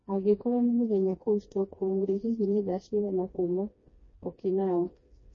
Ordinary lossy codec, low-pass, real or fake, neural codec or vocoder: MP3, 32 kbps; 7.2 kHz; fake; codec, 16 kHz, 2 kbps, FreqCodec, smaller model